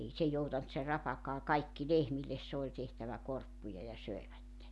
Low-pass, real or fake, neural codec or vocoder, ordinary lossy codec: none; real; none; none